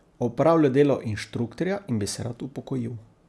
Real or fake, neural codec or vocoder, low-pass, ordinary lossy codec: real; none; none; none